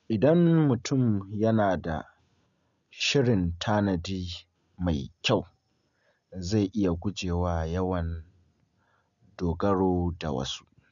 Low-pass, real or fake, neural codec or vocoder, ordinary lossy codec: 7.2 kHz; real; none; none